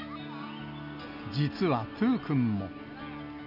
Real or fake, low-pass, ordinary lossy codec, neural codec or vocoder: real; 5.4 kHz; none; none